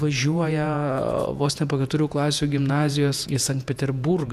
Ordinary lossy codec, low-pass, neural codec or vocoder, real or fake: MP3, 96 kbps; 14.4 kHz; vocoder, 48 kHz, 128 mel bands, Vocos; fake